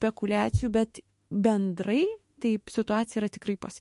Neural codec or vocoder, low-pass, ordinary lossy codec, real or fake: autoencoder, 48 kHz, 32 numbers a frame, DAC-VAE, trained on Japanese speech; 14.4 kHz; MP3, 48 kbps; fake